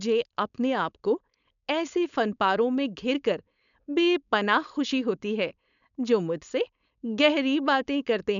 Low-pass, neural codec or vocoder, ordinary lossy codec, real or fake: 7.2 kHz; codec, 16 kHz, 4.8 kbps, FACodec; none; fake